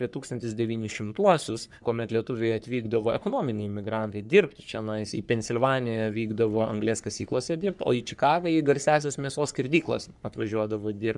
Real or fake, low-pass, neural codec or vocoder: fake; 10.8 kHz; codec, 44.1 kHz, 3.4 kbps, Pupu-Codec